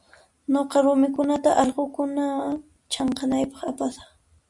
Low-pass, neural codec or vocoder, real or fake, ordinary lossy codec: 10.8 kHz; none; real; MP3, 96 kbps